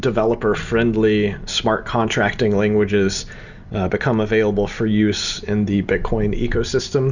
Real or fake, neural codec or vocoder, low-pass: real; none; 7.2 kHz